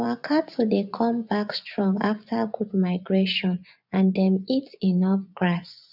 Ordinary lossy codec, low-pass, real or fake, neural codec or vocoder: none; 5.4 kHz; fake; vocoder, 24 kHz, 100 mel bands, Vocos